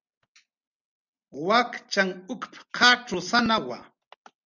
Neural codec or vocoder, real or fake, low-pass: none; real; 7.2 kHz